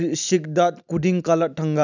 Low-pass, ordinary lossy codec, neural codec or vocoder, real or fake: 7.2 kHz; none; none; real